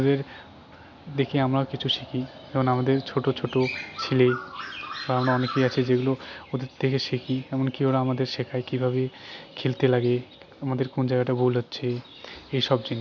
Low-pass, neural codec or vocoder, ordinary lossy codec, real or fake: 7.2 kHz; none; none; real